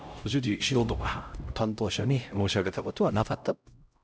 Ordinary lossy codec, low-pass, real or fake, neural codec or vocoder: none; none; fake; codec, 16 kHz, 0.5 kbps, X-Codec, HuBERT features, trained on LibriSpeech